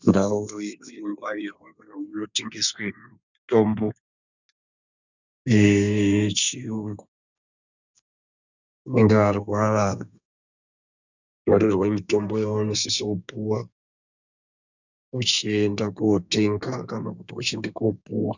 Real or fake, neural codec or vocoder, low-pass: fake; codec, 32 kHz, 1.9 kbps, SNAC; 7.2 kHz